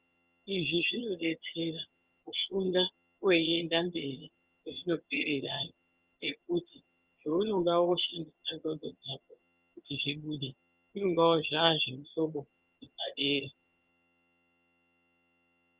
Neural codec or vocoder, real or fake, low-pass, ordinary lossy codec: vocoder, 22.05 kHz, 80 mel bands, HiFi-GAN; fake; 3.6 kHz; Opus, 32 kbps